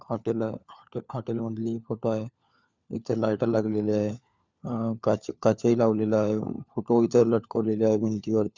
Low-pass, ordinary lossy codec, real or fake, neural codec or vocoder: 7.2 kHz; Opus, 64 kbps; fake; codec, 16 kHz, 4 kbps, FunCodec, trained on LibriTTS, 50 frames a second